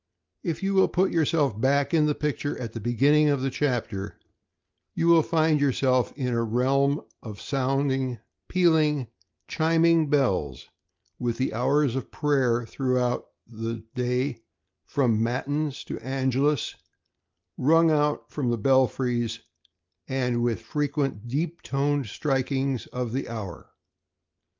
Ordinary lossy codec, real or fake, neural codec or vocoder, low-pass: Opus, 32 kbps; real; none; 7.2 kHz